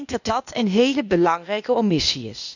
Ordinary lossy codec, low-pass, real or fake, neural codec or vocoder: none; 7.2 kHz; fake; codec, 16 kHz, 0.8 kbps, ZipCodec